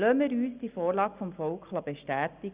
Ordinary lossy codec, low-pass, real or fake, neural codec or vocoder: Opus, 64 kbps; 3.6 kHz; real; none